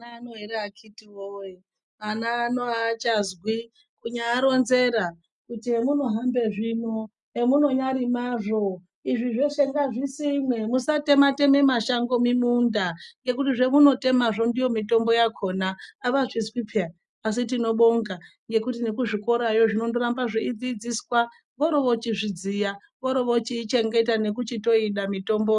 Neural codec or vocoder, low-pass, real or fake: none; 10.8 kHz; real